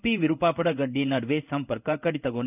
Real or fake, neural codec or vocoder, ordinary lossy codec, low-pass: fake; codec, 16 kHz in and 24 kHz out, 1 kbps, XY-Tokenizer; none; 3.6 kHz